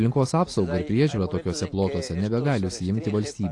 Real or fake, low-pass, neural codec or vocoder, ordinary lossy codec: real; 10.8 kHz; none; AAC, 48 kbps